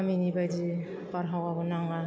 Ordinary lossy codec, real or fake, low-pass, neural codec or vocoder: none; real; none; none